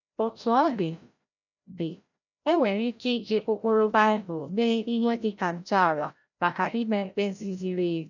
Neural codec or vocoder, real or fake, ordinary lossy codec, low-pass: codec, 16 kHz, 0.5 kbps, FreqCodec, larger model; fake; none; 7.2 kHz